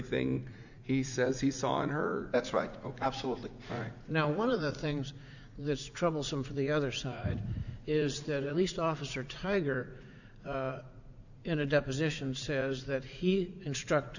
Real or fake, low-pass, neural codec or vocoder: fake; 7.2 kHz; vocoder, 44.1 kHz, 80 mel bands, Vocos